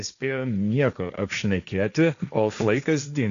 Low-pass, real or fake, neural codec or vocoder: 7.2 kHz; fake; codec, 16 kHz, 1.1 kbps, Voila-Tokenizer